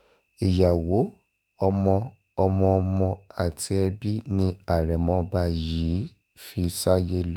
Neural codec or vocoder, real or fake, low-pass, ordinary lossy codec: autoencoder, 48 kHz, 32 numbers a frame, DAC-VAE, trained on Japanese speech; fake; none; none